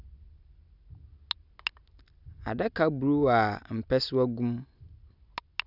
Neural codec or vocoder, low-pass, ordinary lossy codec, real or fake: none; 5.4 kHz; none; real